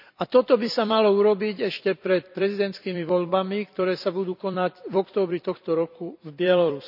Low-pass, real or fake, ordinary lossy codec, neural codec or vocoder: 5.4 kHz; fake; none; vocoder, 44.1 kHz, 128 mel bands every 256 samples, BigVGAN v2